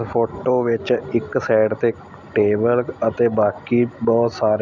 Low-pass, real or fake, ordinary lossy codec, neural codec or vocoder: 7.2 kHz; real; none; none